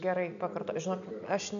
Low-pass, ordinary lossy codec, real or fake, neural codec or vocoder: 7.2 kHz; MP3, 96 kbps; real; none